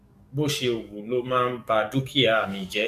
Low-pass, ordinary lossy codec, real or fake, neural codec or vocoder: 14.4 kHz; none; fake; codec, 44.1 kHz, 7.8 kbps, DAC